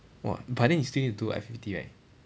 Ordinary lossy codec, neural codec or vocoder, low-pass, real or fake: none; none; none; real